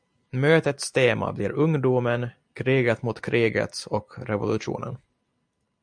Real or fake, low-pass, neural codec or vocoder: real; 9.9 kHz; none